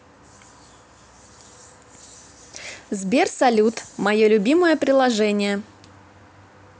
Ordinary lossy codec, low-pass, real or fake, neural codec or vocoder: none; none; real; none